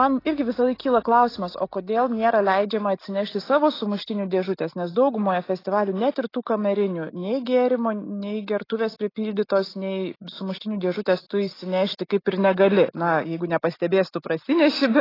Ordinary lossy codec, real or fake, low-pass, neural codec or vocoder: AAC, 24 kbps; real; 5.4 kHz; none